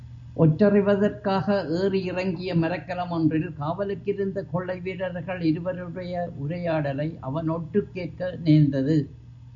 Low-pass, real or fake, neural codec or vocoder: 7.2 kHz; real; none